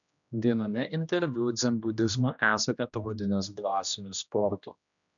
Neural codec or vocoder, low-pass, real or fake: codec, 16 kHz, 1 kbps, X-Codec, HuBERT features, trained on general audio; 7.2 kHz; fake